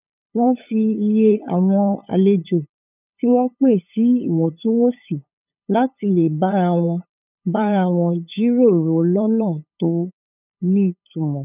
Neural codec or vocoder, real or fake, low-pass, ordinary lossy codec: codec, 16 kHz, 8 kbps, FunCodec, trained on LibriTTS, 25 frames a second; fake; 3.6 kHz; none